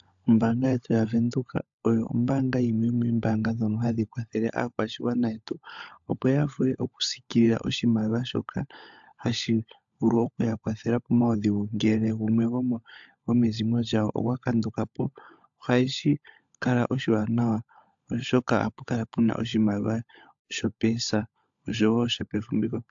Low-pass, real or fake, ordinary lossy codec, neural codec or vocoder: 7.2 kHz; fake; AAC, 64 kbps; codec, 16 kHz, 16 kbps, FunCodec, trained on LibriTTS, 50 frames a second